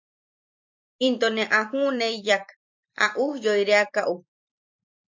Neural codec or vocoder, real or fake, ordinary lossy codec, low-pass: vocoder, 44.1 kHz, 128 mel bands every 256 samples, BigVGAN v2; fake; MP3, 48 kbps; 7.2 kHz